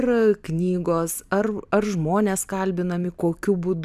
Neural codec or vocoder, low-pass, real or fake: vocoder, 44.1 kHz, 128 mel bands every 256 samples, BigVGAN v2; 14.4 kHz; fake